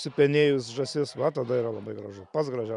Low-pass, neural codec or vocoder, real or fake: 10.8 kHz; none; real